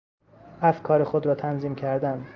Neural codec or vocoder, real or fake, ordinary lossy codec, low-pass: none; real; Opus, 24 kbps; 7.2 kHz